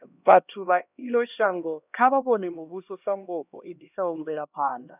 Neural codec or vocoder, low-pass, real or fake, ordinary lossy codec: codec, 16 kHz, 1 kbps, X-Codec, HuBERT features, trained on LibriSpeech; 3.6 kHz; fake; none